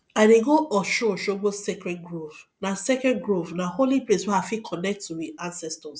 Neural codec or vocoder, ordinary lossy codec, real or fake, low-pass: none; none; real; none